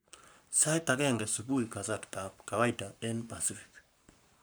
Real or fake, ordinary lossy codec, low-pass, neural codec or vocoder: fake; none; none; codec, 44.1 kHz, 7.8 kbps, Pupu-Codec